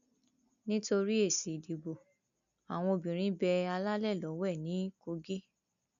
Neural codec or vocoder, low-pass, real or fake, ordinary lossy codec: none; 7.2 kHz; real; none